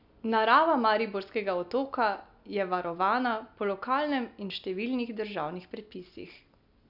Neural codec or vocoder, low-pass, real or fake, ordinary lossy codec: none; 5.4 kHz; real; none